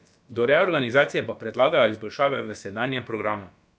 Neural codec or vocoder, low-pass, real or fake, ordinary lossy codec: codec, 16 kHz, about 1 kbps, DyCAST, with the encoder's durations; none; fake; none